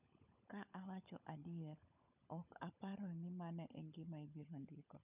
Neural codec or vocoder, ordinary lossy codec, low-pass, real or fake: codec, 16 kHz, 16 kbps, FunCodec, trained on LibriTTS, 50 frames a second; none; 3.6 kHz; fake